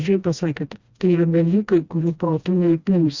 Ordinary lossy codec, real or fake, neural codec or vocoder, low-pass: Opus, 64 kbps; fake; codec, 16 kHz, 1 kbps, FreqCodec, smaller model; 7.2 kHz